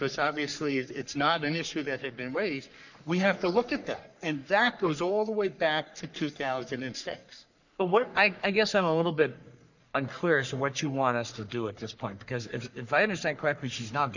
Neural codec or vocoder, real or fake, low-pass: codec, 44.1 kHz, 3.4 kbps, Pupu-Codec; fake; 7.2 kHz